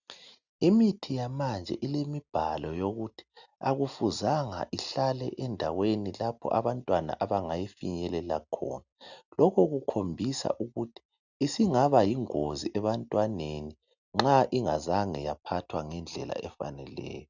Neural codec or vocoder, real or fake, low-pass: none; real; 7.2 kHz